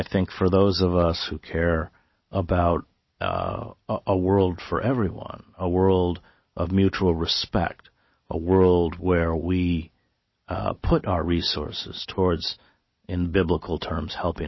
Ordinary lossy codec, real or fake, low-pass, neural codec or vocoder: MP3, 24 kbps; real; 7.2 kHz; none